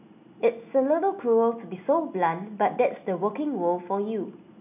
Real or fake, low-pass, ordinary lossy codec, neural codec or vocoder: real; 3.6 kHz; none; none